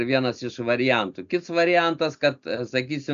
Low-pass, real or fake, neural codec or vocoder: 7.2 kHz; real; none